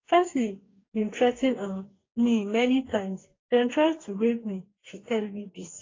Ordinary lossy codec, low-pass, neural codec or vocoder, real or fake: AAC, 32 kbps; 7.2 kHz; codec, 44.1 kHz, 2.6 kbps, DAC; fake